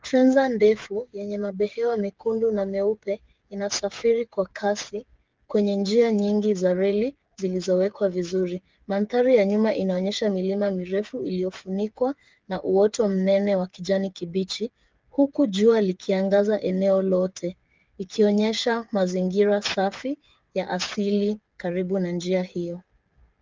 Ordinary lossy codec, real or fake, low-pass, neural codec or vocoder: Opus, 32 kbps; fake; 7.2 kHz; codec, 16 kHz, 8 kbps, FreqCodec, smaller model